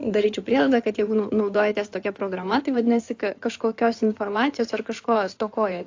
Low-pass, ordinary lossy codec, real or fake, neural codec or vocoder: 7.2 kHz; AAC, 48 kbps; fake; codec, 16 kHz in and 24 kHz out, 2.2 kbps, FireRedTTS-2 codec